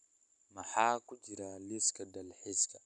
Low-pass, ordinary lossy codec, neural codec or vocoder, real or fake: 10.8 kHz; none; none; real